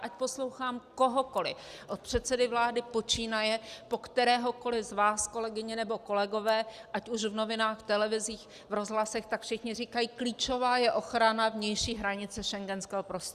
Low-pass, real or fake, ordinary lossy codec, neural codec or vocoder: 14.4 kHz; real; Opus, 64 kbps; none